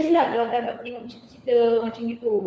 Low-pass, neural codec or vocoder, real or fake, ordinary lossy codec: none; codec, 16 kHz, 4 kbps, FunCodec, trained on LibriTTS, 50 frames a second; fake; none